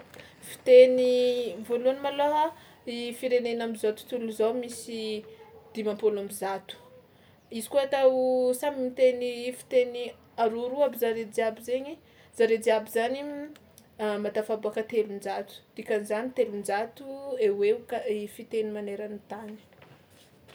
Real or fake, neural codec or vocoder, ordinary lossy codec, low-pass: real; none; none; none